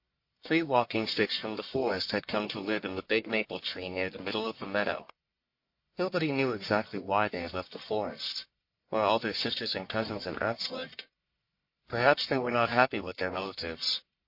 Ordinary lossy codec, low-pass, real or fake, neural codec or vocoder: MP3, 32 kbps; 5.4 kHz; fake; codec, 44.1 kHz, 1.7 kbps, Pupu-Codec